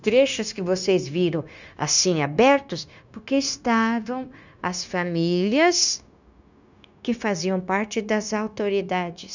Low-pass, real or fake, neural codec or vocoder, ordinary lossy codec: 7.2 kHz; fake; codec, 16 kHz, 0.9 kbps, LongCat-Audio-Codec; none